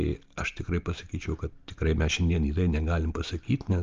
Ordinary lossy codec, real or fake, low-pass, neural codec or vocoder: Opus, 32 kbps; real; 7.2 kHz; none